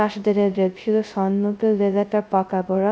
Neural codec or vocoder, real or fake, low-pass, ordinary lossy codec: codec, 16 kHz, 0.2 kbps, FocalCodec; fake; none; none